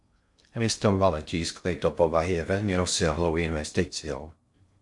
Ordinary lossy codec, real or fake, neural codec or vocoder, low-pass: MP3, 64 kbps; fake; codec, 16 kHz in and 24 kHz out, 0.6 kbps, FocalCodec, streaming, 2048 codes; 10.8 kHz